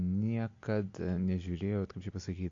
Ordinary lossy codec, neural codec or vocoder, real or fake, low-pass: AAC, 48 kbps; none; real; 7.2 kHz